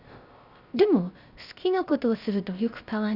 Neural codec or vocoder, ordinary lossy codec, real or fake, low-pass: codec, 16 kHz, 0.3 kbps, FocalCodec; Opus, 64 kbps; fake; 5.4 kHz